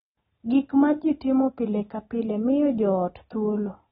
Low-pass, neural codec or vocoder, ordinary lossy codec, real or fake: 14.4 kHz; none; AAC, 16 kbps; real